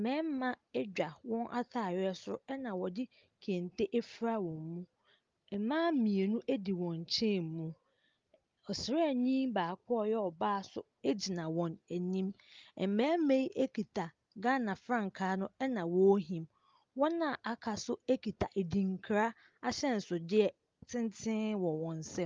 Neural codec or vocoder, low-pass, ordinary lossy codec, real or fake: none; 7.2 kHz; Opus, 32 kbps; real